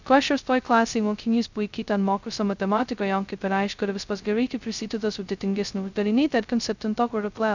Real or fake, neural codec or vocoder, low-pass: fake; codec, 16 kHz, 0.2 kbps, FocalCodec; 7.2 kHz